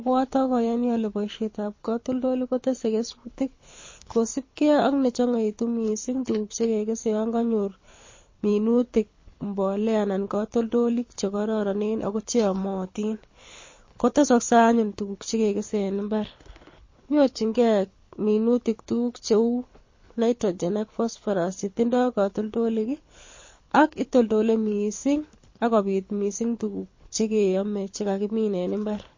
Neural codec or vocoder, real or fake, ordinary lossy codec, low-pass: codec, 24 kHz, 6 kbps, HILCodec; fake; MP3, 32 kbps; 7.2 kHz